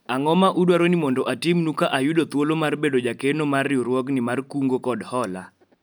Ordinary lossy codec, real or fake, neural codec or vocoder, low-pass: none; real; none; none